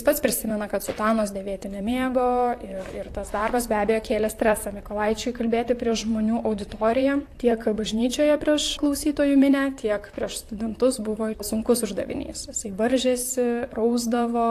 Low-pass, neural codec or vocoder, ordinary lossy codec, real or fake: 14.4 kHz; vocoder, 44.1 kHz, 128 mel bands, Pupu-Vocoder; AAC, 64 kbps; fake